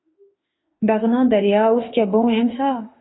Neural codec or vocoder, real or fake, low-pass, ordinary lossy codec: autoencoder, 48 kHz, 32 numbers a frame, DAC-VAE, trained on Japanese speech; fake; 7.2 kHz; AAC, 16 kbps